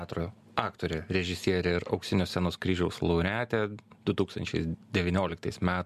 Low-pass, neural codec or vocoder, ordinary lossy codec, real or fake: 14.4 kHz; none; MP3, 96 kbps; real